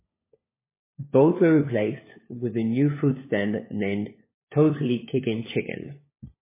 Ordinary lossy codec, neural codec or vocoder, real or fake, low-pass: MP3, 16 kbps; codec, 16 kHz, 4 kbps, FunCodec, trained on LibriTTS, 50 frames a second; fake; 3.6 kHz